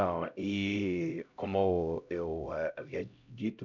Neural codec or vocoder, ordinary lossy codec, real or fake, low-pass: codec, 16 kHz, 0.5 kbps, X-Codec, HuBERT features, trained on LibriSpeech; none; fake; 7.2 kHz